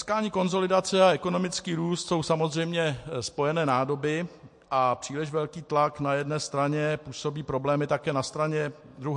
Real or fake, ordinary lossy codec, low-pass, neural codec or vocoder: real; MP3, 48 kbps; 10.8 kHz; none